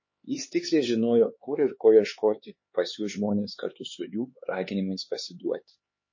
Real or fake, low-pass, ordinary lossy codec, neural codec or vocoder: fake; 7.2 kHz; MP3, 32 kbps; codec, 16 kHz, 4 kbps, X-Codec, HuBERT features, trained on LibriSpeech